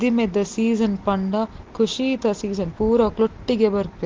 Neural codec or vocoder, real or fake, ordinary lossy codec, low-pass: none; real; Opus, 16 kbps; 7.2 kHz